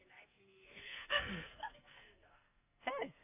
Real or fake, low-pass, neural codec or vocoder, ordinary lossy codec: fake; 3.6 kHz; codec, 44.1 kHz, 2.6 kbps, SNAC; none